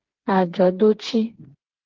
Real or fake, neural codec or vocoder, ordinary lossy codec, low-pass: fake; codec, 16 kHz, 4 kbps, FreqCodec, smaller model; Opus, 16 kbps; 7.2 kHz